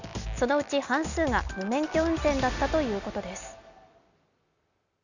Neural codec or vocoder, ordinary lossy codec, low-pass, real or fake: none; none; 7.2 kHz; real